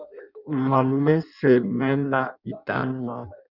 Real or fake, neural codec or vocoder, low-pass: fake; codec, 16 kHz in and 24 kHz out, 0.6 kbps, FireRedTTS-2 codec; 5.4 kHz